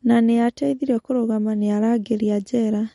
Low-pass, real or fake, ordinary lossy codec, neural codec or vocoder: 19.8 kHz; real; MP3, 48 kbps; none